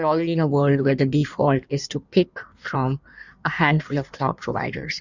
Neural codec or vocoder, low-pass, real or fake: codec, 16 kHz in and 24 kHz out, 1.1 kbps, FireRedTTS-2 codec; 7.2 kHz; fake